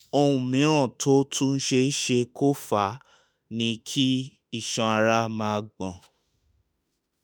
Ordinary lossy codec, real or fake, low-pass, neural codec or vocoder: none; fake; none; autoencoder, 48 kHz, 32 numbers a frame, DAC-VAE, trained on Japanese speech